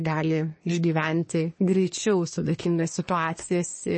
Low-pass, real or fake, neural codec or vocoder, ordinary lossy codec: 9.9 kHz; fake; codec, 24 kHz, 1 kbps, SNAC; MP3, 32 kbps